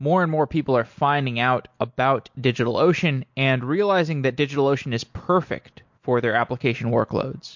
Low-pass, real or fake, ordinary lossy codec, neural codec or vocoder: 7.2 kHz; real; MP3, 48 kbps; none